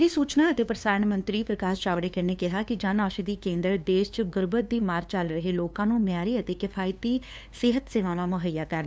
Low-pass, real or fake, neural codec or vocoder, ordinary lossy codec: none; fake; codec, 16 kHz, 2 kbps, FunCodec, trained on LibriTTS, 25 frames a second; none